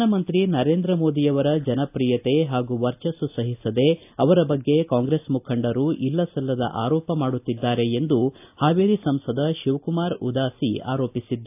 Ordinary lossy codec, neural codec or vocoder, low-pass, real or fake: AAC, 32 kbps; none; 3.6 kHz; real